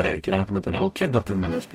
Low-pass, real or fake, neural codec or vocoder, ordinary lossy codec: 19.8 kHz; fake; codec, 44.1 kHz, 0.9 kbps, DAC; MP3, 64 kbps